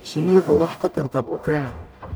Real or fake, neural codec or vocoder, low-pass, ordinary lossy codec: fake; codec, 44.1 kHz, 0.9 kbps, DAC; none; none